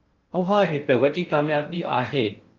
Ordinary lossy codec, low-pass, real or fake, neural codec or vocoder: Opus, 24 kbps; 7.2 kHz; fake; codec, 16 kHz in and 24 kHz out, 0.6 kbps, FocalCodec, streaming, 2048 codes